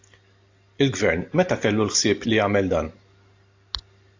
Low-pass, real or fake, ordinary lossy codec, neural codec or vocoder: 7.2 kHz; real; AAC, 48 kbps; none